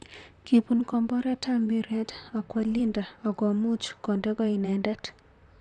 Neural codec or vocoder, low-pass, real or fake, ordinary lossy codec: vocoder, 44.1 kHz, 128 mel bands, Pupu-Vocoder; 10.8 kHz; fake; none